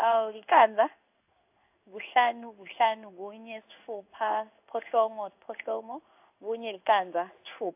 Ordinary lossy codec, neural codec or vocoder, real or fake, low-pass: AAC, 32 kbps; codec, 16 kHz in and 24 kHz out, 1 kbps, XY-Tokenizer; fake; 3.6 kHz